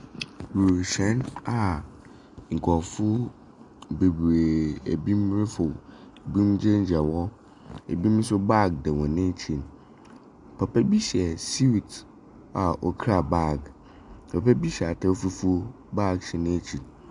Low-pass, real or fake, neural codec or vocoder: 10.8 kHz; real; none